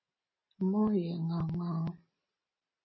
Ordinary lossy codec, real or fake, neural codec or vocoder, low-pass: MP3, 24 kbps; real; none; 7.2 kHz